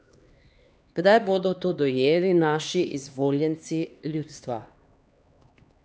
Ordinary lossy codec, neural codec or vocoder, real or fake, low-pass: none; codec, 16 kHz, 2 kbps, X-Codec, HuBERT features, trained on LibriSpeech; fake; none